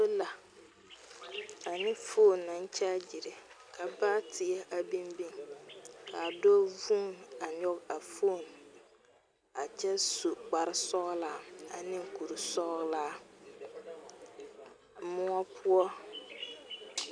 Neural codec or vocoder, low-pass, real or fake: none; 9.9 kHz; real